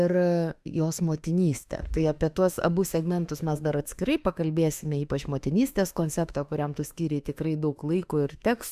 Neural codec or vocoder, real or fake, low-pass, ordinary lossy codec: autoencoder, 48 kHz, 32 numbers a frame, DAC-VAE, trained on Japanese speech; fake; 14.4 kHz; Opus, 64 kbps